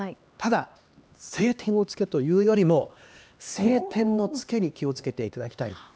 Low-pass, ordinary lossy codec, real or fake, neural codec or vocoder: none; none; fake; codec, 16 kHz, 2 kbps, X-Codec, HuBERT features, trained on LibriSpeech